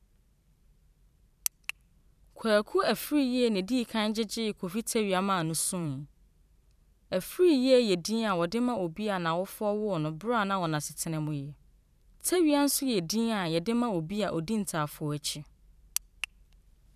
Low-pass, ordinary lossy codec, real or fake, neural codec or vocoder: 14.4 kHz; none; fake; vocoder, 44.1 kHz, 128 mel bands every 512 samples, BigVGAN v2